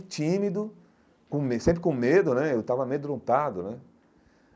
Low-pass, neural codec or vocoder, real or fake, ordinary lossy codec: none; none; real; none